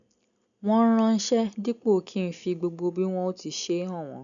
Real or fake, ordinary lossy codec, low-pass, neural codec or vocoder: real; none; 7.2 kHz; none